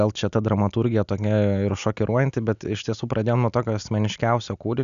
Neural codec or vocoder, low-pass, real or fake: none; 7.2 kHz; real